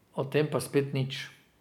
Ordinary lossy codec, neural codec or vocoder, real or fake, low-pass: none; none; real; 19.8 kHz